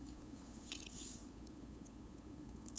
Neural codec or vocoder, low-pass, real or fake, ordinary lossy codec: codec, 16 kHz, 8 kbps, FunCodec, trained on LibriTTS, 25 frames a second; none; fake; none